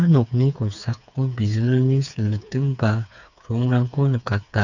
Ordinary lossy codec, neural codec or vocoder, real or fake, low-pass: none; codec, 16 kHz, 4 kbps, FreqCodec, smaller model; fake; 7.2 kHz